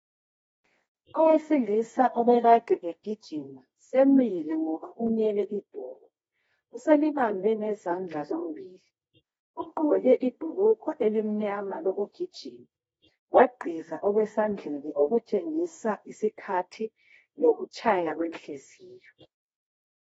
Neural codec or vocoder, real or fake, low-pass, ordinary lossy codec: codec, 24 kHz, 0.9 kbps, WavTokenizer, medium music audio release; fake; 10.8 kHz; AAC, 24 kbps